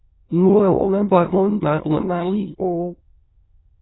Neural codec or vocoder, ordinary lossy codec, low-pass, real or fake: autoencoder, 22.05 kHz, a latent of 192 numbers a frame, VITS, trained on many speakers; AAC, 16 kbps; 7.2 kHz; fake